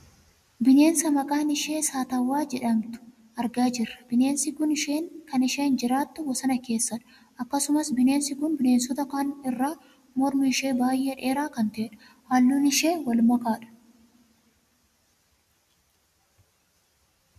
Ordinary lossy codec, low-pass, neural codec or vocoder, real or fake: MP3, 96 kbps; 14.4 kHz; none; real